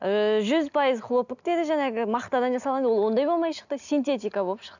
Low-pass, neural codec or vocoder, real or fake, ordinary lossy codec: 7.2 kHz; none; real; none